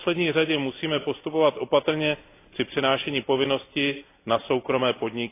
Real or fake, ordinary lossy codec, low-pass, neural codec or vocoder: real; AAC, 24 kbps; 3.6 kHz; none